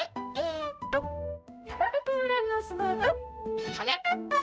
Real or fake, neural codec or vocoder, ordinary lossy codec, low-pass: fake; codec, 16 kHz, 0.5 kbps, X-Codec, HuBERT features, trained on balanced general audio; none; none